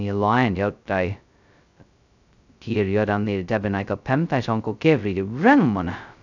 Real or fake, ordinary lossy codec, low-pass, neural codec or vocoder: fake; none; 7.2 kHz; codec, 16 kHz, 0.2 kbps, FocalCodec